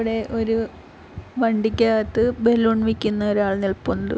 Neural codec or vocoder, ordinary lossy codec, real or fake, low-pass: none; none; real; none